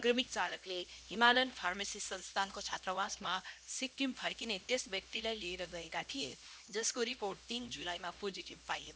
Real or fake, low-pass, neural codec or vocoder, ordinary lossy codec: fake; none; codec, 16 kHz, 1 kbps, X-Codec, HuBERT features, trained on LibriSpeech; none